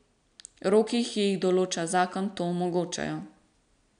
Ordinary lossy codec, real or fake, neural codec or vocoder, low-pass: none; real; none; 9.9 kHz